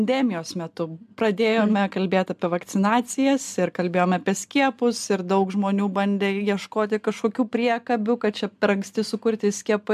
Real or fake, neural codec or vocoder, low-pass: real; none; 14.4 kHz